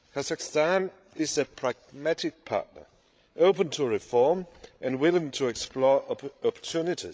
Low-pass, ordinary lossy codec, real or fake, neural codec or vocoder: none; none; fake; codec, 16 kHz, 16 kbps, FreqCodec, larger model